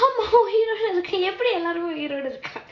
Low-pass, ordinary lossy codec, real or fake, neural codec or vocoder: 7.2 kHz; AAC, 32 kbps; real; none